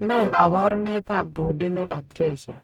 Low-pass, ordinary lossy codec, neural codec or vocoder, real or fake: 19.8 kHz; none; codec, 44.1 kHz, 0.9 kbps, DAC; fake